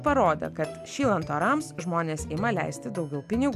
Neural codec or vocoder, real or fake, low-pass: none; real; 14.4 kHz